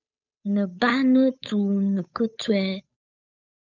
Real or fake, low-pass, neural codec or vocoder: fake; 7.2 kHz; codec, 16 kHz, 8 kbps, FunCodec, trained on Chinese and English, 25 frames a second